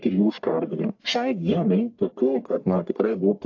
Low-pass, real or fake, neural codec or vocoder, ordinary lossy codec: 7.2 kHz; fake; codec, 44.1 kHz, 1.7 kbps, Pupu-Codec; AAC, 48 kbps